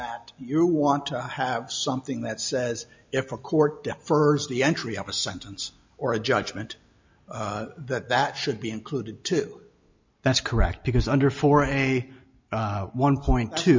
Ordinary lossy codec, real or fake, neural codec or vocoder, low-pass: MP3, 48 kbps; real; none; 7.2 kHz